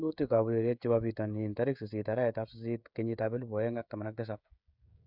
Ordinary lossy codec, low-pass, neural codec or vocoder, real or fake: none; 5.4 kHz; codec, 16 kHz, 16 kbps, FreqCodec, smaller model; fake